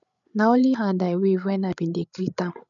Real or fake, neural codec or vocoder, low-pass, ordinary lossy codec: fake; codec, 16 kHz, 8 kbps, FreqCodec, larger model; 7.2 kHz; none